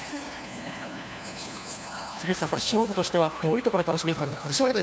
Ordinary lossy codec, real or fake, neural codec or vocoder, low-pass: none; fake; codec, 16 kHz, 1 kbps, FunCodec, trained on LibriTTS, 50 frames a second; none